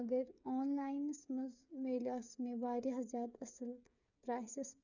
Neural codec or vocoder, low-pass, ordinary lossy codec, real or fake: codec, 16 kHz, 8 kbps, FreqCodec, smaller model; 7.2 kHz; none; fake